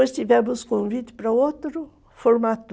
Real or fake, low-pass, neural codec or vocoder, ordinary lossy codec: real; none; none; none